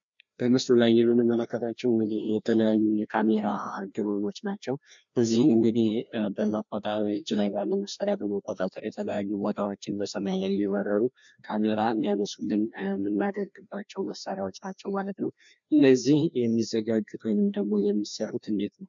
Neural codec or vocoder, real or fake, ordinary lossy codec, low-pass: codec, 16 kHz, 1 kbps, FreqCodec, larger model; fake; MP3, 64 kbps; 7.2 kHz